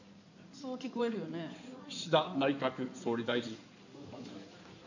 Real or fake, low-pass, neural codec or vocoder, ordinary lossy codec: fake; 7.2 kHz; codec, 16 kHz in and 24 kHz out, 2.2 kbps, FireRedTTS-2 codec; none